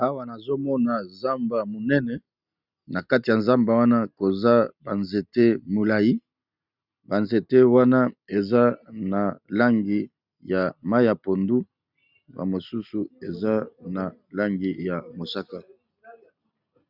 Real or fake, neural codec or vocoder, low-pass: real; none; 5.4 kHz